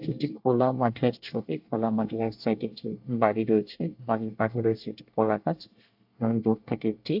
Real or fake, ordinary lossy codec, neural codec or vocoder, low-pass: fake; AAC, 48 kbps; codec, 24 kHz, 1 kbps, SNAC; 5.4 kHz